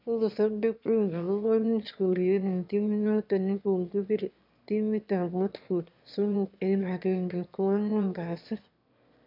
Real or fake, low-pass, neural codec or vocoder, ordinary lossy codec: fake; 5.4 kHz; autoencoder, 22.05 kHz, a latent of 192 numbers a frame, VITS, trained on one speaker; MP3, 48 kbps